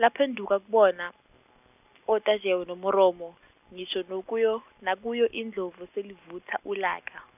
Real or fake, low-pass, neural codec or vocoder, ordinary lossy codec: real; 3.6 kHz; none; none